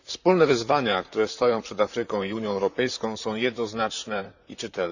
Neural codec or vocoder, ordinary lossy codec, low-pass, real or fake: vocoder, 44.1 kHz, 128 mel bands, Pupu-Vocoder; none; 7.2 kHz; fake